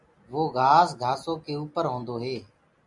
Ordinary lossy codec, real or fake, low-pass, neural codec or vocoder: MP3, 64 kbps; real; 10.8 kHz; none